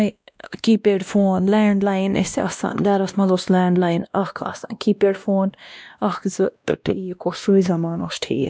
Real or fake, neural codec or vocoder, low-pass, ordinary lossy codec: fake; codec, 16 kHz, 1 kbps, X-Codec, WavLM features, trained on Multilingual LibriSpeech; none; none